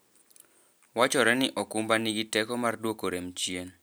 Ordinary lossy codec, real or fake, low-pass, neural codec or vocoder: none; real; none; none